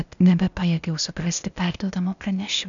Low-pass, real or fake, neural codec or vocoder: 7.2 kHz; fake; codec, 16 kHz, 0.8 kbps, ZipCodec